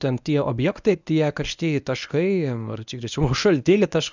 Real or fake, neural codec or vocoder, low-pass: fake; codec, 24 kHz, 0.9 kbps, WavTokenizer, medium speech release version 1; 7.2 kHz